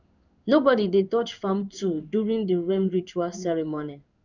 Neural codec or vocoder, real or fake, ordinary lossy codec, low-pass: codec, 16 kHz in and 24 kHz out, 1 kbps, XY-Tokenizer; fake; none; 7.2 kHz